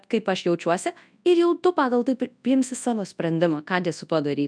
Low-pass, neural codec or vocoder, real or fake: 9.9 kHz; codec, 24 kHz, 0.9 kbps, WavTokenizer, large speech release; fake